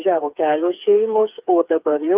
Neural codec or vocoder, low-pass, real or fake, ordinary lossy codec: codec, 16 kHz, 4 kbps, FreqCodec, smaller model; 3.6 kHz; fake; Opus, 24 kbps